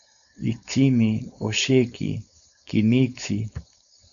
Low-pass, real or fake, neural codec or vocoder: 7.2 kHz; fake; codec, 16 kHz, 4.8 kbps, FACodec